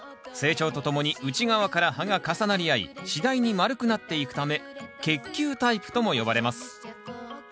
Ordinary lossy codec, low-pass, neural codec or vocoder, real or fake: none; none; none; real